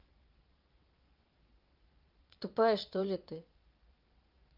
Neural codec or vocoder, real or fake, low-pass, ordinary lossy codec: vocoder, 22.05 kHz, 80 mel bands, Vocos; fake; 5.4 kHz; Opus, 24 kbps